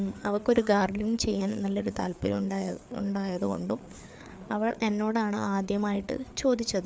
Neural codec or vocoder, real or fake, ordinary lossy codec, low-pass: codec, 16 kHz, 4 kbps, FreqCodec, larger model; fake; none; none